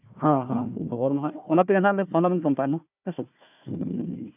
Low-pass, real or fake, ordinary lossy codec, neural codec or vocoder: 3.6 kHz; fake; none; codec, 16 kHz, 1 kbps, FunCodec, trained on Chinese and English, 50 frames a second